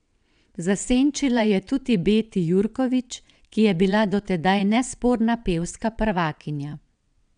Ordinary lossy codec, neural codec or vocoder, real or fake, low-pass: none; vocoder, 22.05 kHz, 80 mel bands, WaveNeXt; fake; 9.9 kHz